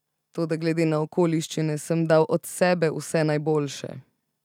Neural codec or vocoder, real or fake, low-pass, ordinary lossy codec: none; real; 19.8 kHz; none